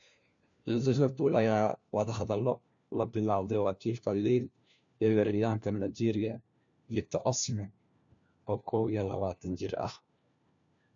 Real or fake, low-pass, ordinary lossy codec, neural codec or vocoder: fake; 7.2 kHz; MP3, 64 kbps; codec, 16 kHz, 1 kbps, FunCodec, trained on LibriTTS, 50 frames a second